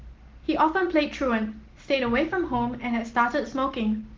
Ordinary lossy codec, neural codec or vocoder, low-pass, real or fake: Opus, 16 kbps; none; 7.2 kHz; real